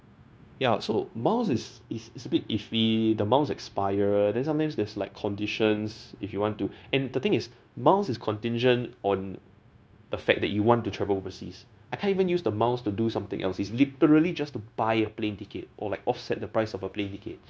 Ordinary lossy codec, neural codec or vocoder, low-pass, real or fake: none; codec, 16 kHz, 0.9 kbps, LongCat-Audio-Codec; none; fake